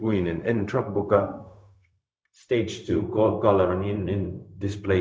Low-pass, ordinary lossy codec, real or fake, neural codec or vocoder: none; none; fake; codec, 16 kHz, 0.4 kbps, LongCat-Audio-Codec